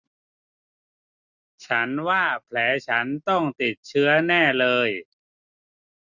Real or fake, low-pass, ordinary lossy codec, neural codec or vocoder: real; 7.2 kHz; none; none